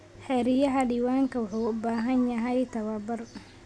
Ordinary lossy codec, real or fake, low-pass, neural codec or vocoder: none; real; none; none